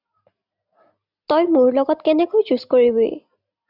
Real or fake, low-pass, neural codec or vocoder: real; 5.4 kHz; none